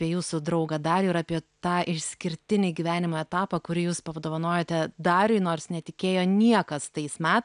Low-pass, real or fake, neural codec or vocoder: 9.9 kHz; real; none